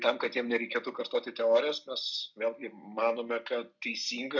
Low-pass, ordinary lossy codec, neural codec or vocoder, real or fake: 7.2 kHz; MP3, 64 kbps; none; real